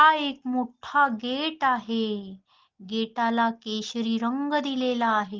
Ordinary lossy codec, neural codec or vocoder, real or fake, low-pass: Opus, 16 kbps; none; real; 7.2 kHz